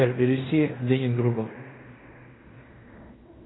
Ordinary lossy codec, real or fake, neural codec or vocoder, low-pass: AAC, 16 kbps; fake; codec, 16 kHz in and 24 kHz out, 0.9 kbps, LongCat-Audio-Codec, fine tuned four codebook decoder; 7.2 kHz